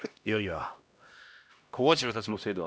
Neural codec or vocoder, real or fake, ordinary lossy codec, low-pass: codec, 16 kHz, 1 kbps, X-Codec, HuBERT features, trained on LibriSpeech; fake; none; none